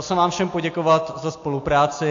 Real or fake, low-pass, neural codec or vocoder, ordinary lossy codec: real; 7.2 kHz; none; AAC, 48 kbps